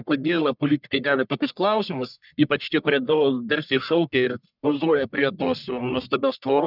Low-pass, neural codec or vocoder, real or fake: 5.4 kHz; codec, 44.1 kHz, 1.7 kbps, Pupu-Codec; fake